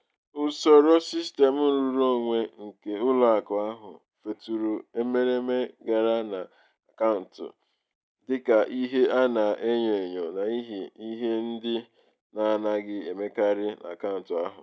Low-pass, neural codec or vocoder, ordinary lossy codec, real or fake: none; none; none; real